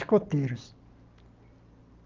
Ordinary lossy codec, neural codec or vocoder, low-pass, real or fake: Opus, 24 kbps; none; 7.2 kHz; real